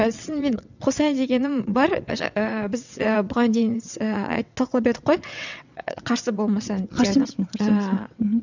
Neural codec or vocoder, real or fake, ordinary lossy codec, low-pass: vocoder, 22.05 kHz, 80 mel bands, WaveNeXt; fake; none; 7.2 kHz